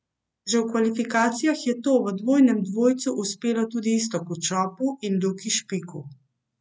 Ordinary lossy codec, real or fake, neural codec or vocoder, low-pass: none; real; none; none